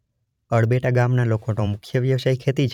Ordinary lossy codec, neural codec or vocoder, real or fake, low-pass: none; vocoder, 44.1 kHz, 128 mel bands every 512 samples, BigVGAN v2; fake; 19.8 kHz